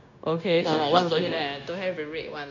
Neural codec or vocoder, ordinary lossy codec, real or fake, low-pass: codec, 16 kHz, 0.9 kbps, LongCat-Audio-Codec; none; fake; 7.2 kHz